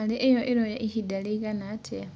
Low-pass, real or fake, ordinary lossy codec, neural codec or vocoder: none; real; none; none